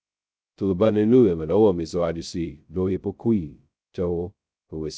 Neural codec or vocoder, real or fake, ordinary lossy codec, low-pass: codec, 16 kHz, 0.2 kbps, FocalCodec; fake; none; none